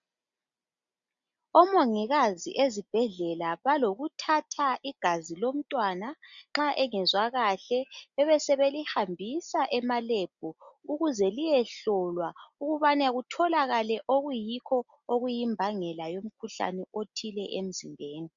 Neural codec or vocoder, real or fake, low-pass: none; real; 7.2 kHz